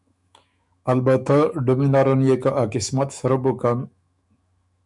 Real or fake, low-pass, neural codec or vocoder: fake; 10.8 kHz; autoencoder, 48 kHz, 128 numbers a frame, DAC-VAE, trained on Japanese speech